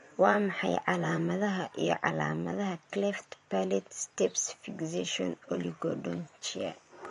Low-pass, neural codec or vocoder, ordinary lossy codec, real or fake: 10.8 kHz; vocoder, 24 kHz, 100 mel bands, Vocos; MP3, 48 kbps; fake